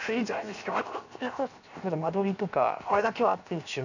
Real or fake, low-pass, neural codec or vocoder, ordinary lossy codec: fake; 7.2 kHz; codec, 16 kHz, 0.7 kbps, FocalCodec; none